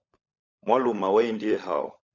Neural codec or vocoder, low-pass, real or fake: codec, 16 kHz, 16 kbps, FunCodec, trained on LibriTTS, 50 frames a second; 7.2 kHz; fake